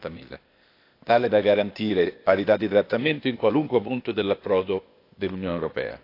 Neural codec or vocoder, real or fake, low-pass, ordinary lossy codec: codec, 16 kHz, 0.8 kbps, ZipCodec; fake; 5.4 kHz; AAC, 32 kbps